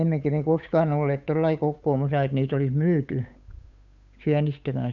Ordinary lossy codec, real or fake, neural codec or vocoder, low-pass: none; fake; codec, 16 kHz, 8 kbps, FunCodec, trained on Chinese and English, 25 frames a second; 7.2 kHz